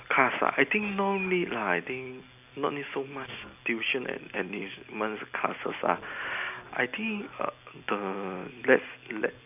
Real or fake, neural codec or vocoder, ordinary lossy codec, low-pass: real; none; none; 3.6 kHz